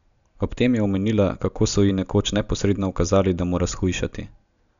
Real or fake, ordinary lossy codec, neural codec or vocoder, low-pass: real; none; none; 7.2 kHz